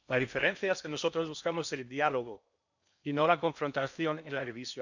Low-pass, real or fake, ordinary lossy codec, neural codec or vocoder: 7.2 kHz; fake; none; codec, 16 kHz in and 24 kHz out, 0.8 kbps, FocalCodec, streaming, 65536 codes